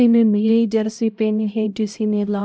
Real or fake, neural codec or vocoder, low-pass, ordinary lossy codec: fake; codec, 16 kHz, 0.5 kbps, X-Codec, HuBERT features, trained on LibriSpeech; none; none